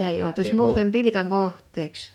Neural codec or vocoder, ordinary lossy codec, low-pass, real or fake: codec, 44.1 kHz, 2.6 kbps, DAC; none; 19.8 kHz; fake